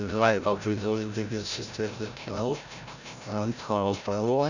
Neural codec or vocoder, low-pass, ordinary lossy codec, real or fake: codec, 16 kHz, 0.5 kbps, FreqCodec, larger model; 7.2 kHz; none; fake